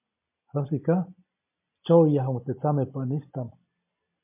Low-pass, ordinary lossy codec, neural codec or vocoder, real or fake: 3.6 kHz; MP3, 24 kbps; none; real